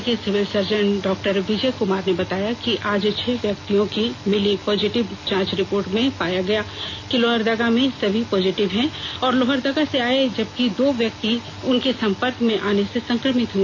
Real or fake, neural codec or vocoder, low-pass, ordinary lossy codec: real; none; none; none